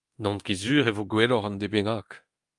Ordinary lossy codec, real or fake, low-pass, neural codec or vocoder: Opus, 32 kbps; fake; 10.8 kHz; codec, 24 kHz, 0.9 kbps, DualCodec